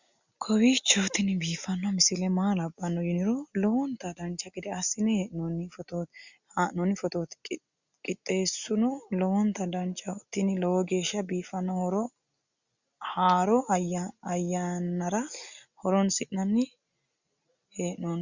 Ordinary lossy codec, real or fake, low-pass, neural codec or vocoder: Opus, 64 kbps; real; 7.2 kHz; none